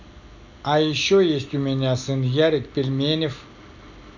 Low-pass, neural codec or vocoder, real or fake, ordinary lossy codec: 7.2 kHz; none; real; none